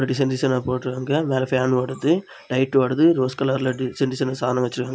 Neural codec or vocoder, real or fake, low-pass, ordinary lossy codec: none; real; none; none